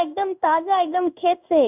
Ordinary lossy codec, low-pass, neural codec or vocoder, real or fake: none; 3.6 kHz; codec, 16 kHz in and 24 kHz out, 1 kbps, XY-Tokenizer; fake